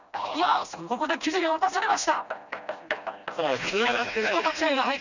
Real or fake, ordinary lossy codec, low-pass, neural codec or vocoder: fake; none; 7.2 kHz; codec, 16 kHz, 1 kbps, FreqCodec, smaller model